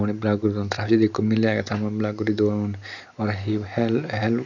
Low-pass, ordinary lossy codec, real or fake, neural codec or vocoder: 7.2 kHz; none; real; none